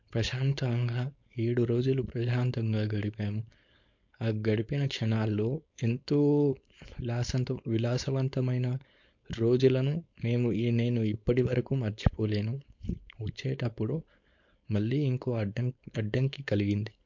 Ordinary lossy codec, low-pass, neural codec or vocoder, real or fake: MP3, 48 kbps; 7.2 kHz; codec, 16 kHz, 4.8 kbps, FACodec; fake